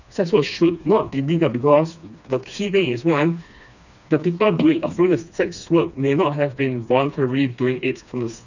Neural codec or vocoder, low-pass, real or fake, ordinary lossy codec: codec, 16 kHz, 2 kbps, FreqCodec, smaller model; 7.2 kHz; fake; none